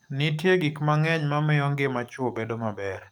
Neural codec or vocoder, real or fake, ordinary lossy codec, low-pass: codec, 44.1 kHz, 7.8 kbps, DAC; fake; none; 19.8 kHz